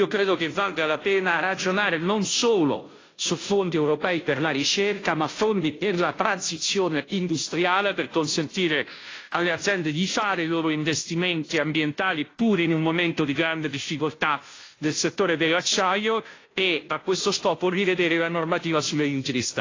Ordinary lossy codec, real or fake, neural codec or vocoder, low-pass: AAC, 32 kbps; fake; codec, 16 kHz, 0.5 kbps, FunCodec, trained on Chinese and English, 25 frames a second; 7.2 kHz